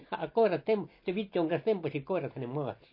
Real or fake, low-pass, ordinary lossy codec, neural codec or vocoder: real; 5.4 kHz; MP3, 32 kbps; none